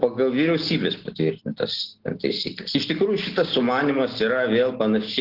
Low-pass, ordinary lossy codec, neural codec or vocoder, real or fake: 5.4 kHz; Opus, 16 kbps; none; real